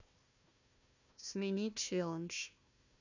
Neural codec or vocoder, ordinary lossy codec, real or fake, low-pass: codec, 16 kHz, 1 kbps, FunCodec, trained on Chinese and English, 50 frames a second; none; fake; 7.2 kHz